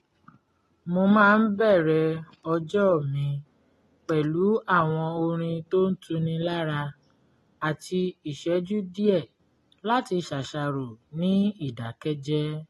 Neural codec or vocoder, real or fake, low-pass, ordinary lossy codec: none; real; 19.8 kHz; AAC, 32 kbps